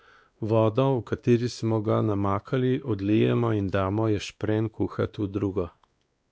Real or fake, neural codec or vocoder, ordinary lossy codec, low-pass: fake; codec, 16 kHz, 2 kbps, X-Codec, WavLM features, trained on Multilingual LibriSpeech; none; none